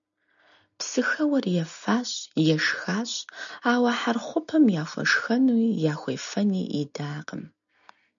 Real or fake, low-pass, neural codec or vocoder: real; 7.2 kHz; none